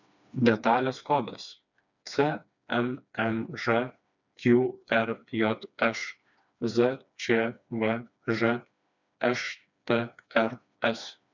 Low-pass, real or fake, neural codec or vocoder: 7.2 kHz; fake; codec, 16 kHz, 2 kbps, FreqCodec, smaller model